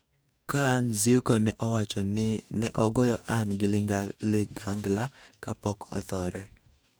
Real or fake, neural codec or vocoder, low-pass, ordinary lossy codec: fake; codec, 44.1 kHz, 2.6 kbps, DAC; none; none